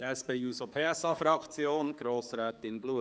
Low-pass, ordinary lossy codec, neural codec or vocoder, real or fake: none; none; codec, 16 kHz, 4 kbps, X-Codec, HuBERT features, trained on general audio; fake